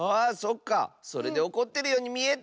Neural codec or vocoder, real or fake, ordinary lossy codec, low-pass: none; real; none; none